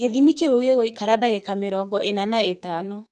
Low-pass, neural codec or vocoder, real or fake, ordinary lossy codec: 10.8 kHz; codec, 44.1 kHz, 2.6 kbps, SNAC; fake; none